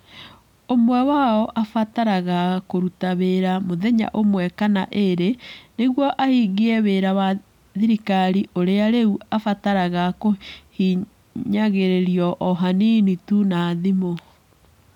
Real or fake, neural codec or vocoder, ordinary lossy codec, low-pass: real; none; none; 19.8 kHz